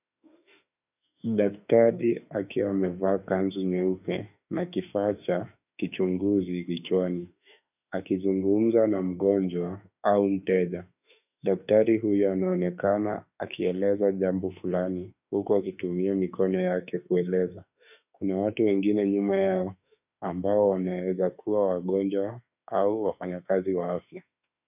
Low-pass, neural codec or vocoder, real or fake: 3.6 kHz; autoencoder, 48 kHz, 32 numbers a frame, DAC-VAE, trained on Japanese speech; fake